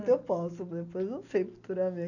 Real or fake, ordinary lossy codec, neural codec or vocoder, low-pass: real; none; none; 7.2 kHz